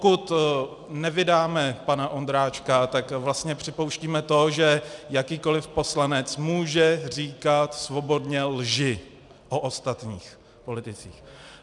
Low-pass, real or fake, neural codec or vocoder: 10.8 kHz; real; none